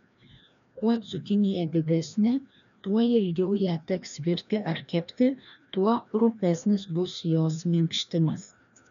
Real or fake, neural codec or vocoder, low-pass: fake; codec, 16 kHz, 1 kbps, FreqCodec, larger model; 7.2 kHz